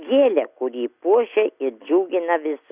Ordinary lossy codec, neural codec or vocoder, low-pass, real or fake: Opus, 64 kbps; none; 3.6 kHz; real